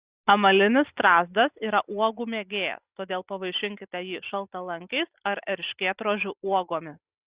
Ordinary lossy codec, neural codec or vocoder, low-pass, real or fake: Opus, 64 kbps; none; 3.6 kHz; real